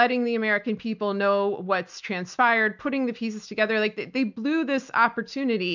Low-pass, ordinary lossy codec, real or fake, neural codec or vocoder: 7.2 kHz; MP3, 64 kbps; real; none